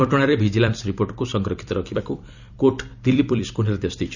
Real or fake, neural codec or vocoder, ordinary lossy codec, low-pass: fake; vocoder, 44.1 kHz, 128 mel bands every 256 samples, BigVGAN v2; none; 7.2 kHz